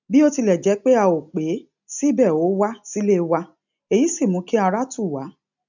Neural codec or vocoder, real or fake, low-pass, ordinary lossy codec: none; real; 7.2 kHz; none